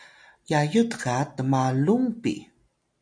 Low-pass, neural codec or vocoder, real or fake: 9.9 kHz; none; real